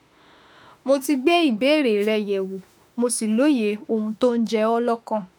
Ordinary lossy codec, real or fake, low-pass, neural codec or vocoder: none; fake; none; autoencoder, 48 kHz, 32 numbers a frame, DAC-VAE, trained on Japanese speech